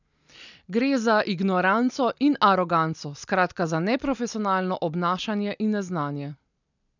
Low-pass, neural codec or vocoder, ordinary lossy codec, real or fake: 7.2 kHz; none; none; real